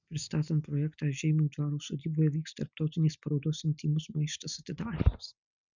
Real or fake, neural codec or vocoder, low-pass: real; none; 7.2 kHz